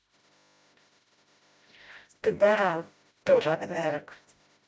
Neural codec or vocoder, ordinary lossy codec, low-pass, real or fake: codec, 16 kHz, 0.5 kbps, FreqCodec, smaller model; none; none; fake